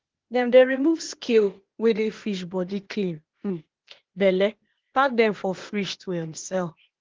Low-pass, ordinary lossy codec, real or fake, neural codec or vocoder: 7.2 kHz; Opus, 16 kbps; fake; codec, 16 kHz, 0.8 kbps, ZipCodec